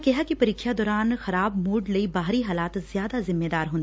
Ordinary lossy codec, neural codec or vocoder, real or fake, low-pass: none; none; real; none